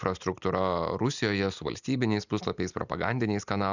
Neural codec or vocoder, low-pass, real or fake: none; 7.2 kHz; real